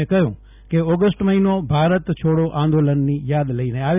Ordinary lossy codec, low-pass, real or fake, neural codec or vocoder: none; 3.6 kHz; real; none